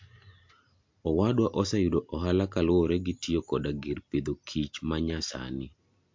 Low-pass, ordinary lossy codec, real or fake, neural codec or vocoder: 7.2 kHz; MP3, 48 kbps; real; none